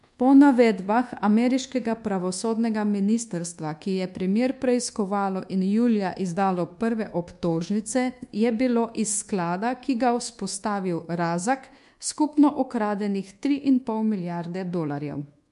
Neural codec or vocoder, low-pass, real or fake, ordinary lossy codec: codec, 24 kHz, 1.2 kbps, DualCodec; 10.8 kHz; fake; MP3, 64 kbps